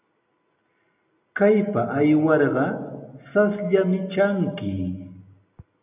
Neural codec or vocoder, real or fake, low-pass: none; real; 3.6 kHz